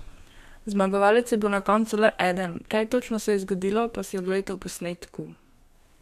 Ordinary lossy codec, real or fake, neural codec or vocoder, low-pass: none; fake; codec, 32 kHz, 1.9 kbps, SNAC; 14.4 kHz